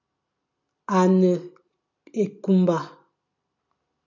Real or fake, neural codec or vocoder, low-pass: real; none; 7.2 kHz